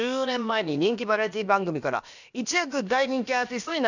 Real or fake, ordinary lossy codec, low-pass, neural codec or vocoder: fake; none; 7.2 kHz; codec, 16 kHz, about 1 kbps, DyCAST, with the encoder's durations